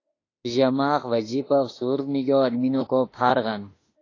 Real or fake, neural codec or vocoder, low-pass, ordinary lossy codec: fake; autoencoder, 48 kHz, 32 numbers a frame, DAC-VAE, trained on Japanese speech; 7.2 kHz; AAC, 32 kbps